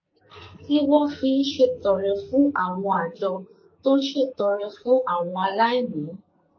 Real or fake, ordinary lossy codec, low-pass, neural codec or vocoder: fake; MP3, 32 kbps; 7.2 kHz; codec, 44.1 kHz, 2.6 kbps, SNAC